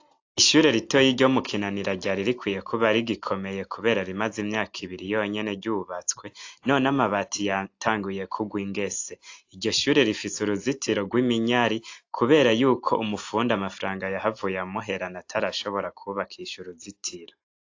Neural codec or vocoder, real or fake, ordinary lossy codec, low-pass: none; real; AAC, 48 kbps; 7.2 kHz